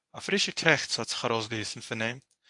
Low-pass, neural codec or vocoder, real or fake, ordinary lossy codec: 10.8 kHz; codec, 24 kHz, 0.9 kbps, WavTokenizer, medium speech release version 1; fake; AAC, 64 kbps